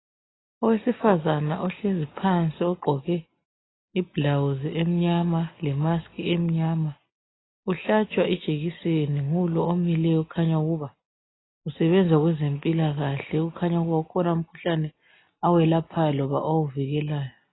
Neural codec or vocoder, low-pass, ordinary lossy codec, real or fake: none; 7.2 kHz; AAC, 16 kbps; real